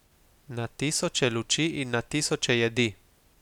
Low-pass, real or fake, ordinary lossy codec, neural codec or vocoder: 19.8 kHz; real; none; none